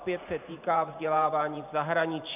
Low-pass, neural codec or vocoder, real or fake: 3.6 kHz; vocoder, 44.1 kHz, 128 mel bands every 512 samples, BigVGAN v2; fake